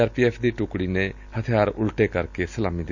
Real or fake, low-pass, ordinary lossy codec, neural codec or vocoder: real; 7.2 kHz; none; none